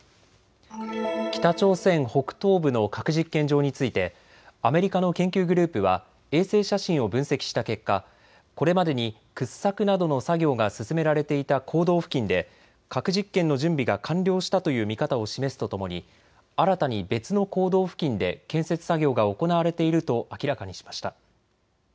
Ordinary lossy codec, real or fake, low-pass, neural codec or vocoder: none; real; none; none